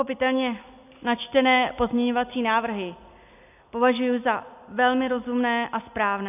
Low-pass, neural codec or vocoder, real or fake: 3.6 kHz; none; real